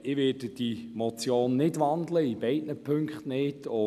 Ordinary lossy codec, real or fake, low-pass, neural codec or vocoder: none; real; 14.4 kHz; none